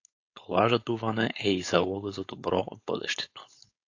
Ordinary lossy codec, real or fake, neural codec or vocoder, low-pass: AAC, 48 kbps; fake; codec, 16 kHz, 4.8 kbps, FACodec; 7.2 kHz